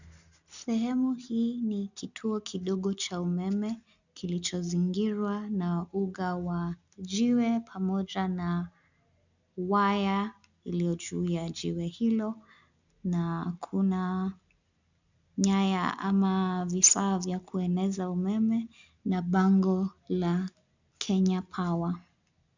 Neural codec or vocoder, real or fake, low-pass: none; real; 7.2 kHz